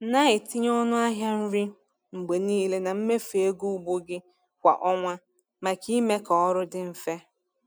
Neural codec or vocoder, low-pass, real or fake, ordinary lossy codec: none; none; real; none